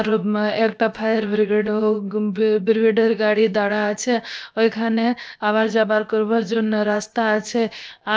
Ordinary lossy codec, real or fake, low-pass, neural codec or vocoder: none; fake; none; codec, 16 kHz, 0.7 kbps, FocalCodec